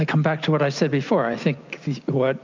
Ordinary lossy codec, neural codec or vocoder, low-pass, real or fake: MP3, 64 kbps; none; 7.2 kHz; real